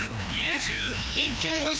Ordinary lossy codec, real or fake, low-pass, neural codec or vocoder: none; fake; none; codec, 16 kHz, 1 kbps, FreqCodec, larger model